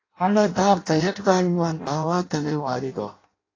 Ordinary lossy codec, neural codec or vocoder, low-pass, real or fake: AAC, 32 kbps; codec, 16 kHz in and 24 kHz out, 0.6 kbps, FireRedTTS-2 codec; 7.2 kHz; fake